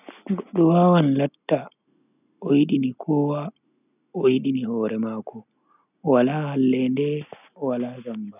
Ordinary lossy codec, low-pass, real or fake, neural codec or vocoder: none; 3.6 kHz; real; none